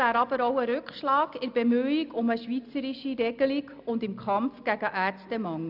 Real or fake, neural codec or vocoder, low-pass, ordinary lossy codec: real; none; 5.4 kHz; none